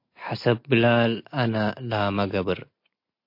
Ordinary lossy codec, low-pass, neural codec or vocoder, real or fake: MP3, 32 kbps; 5.4 kHz; none; real